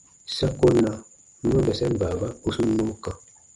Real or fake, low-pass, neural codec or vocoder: real; 10.8 kHz; none